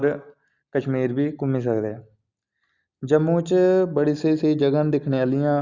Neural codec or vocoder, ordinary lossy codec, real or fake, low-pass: none; Opus, 64 kbps; real; 7.2 kHz